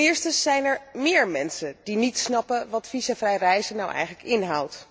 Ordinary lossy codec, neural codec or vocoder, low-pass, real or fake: none; none; none; real